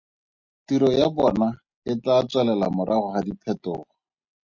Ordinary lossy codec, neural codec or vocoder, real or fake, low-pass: Opus, 64 kbps; none; real; 7.2 kHz